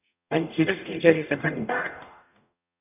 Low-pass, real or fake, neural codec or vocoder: 3.6 kHz; fake; codec, 44.1 kHz, 0.9 kbps, DAC